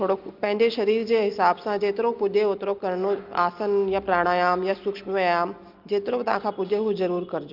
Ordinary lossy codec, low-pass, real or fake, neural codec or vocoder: Opus, 32 kbps; 5.4 kHz; real; none